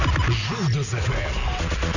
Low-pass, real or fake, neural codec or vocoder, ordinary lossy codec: 7.2 kHz; real; none; none